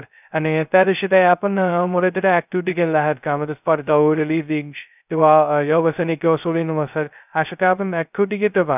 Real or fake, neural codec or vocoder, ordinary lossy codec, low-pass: fake; codec, 16 kHz, 0.2 kbps, FocalCodec; none; 3.6 kHz